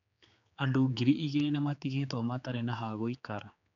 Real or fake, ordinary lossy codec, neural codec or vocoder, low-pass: fake; MP3, 96 kbps; codec, 16 kHz, 4 kbps, X-Codec, HuBERT features, trained on general audio; 7.2 kHz